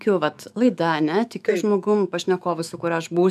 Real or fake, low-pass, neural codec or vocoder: fake; 14.4 kHz; autoencoder, 48 kHz, 128 numbers a frame, DAC-VAE, trained on Japanese speech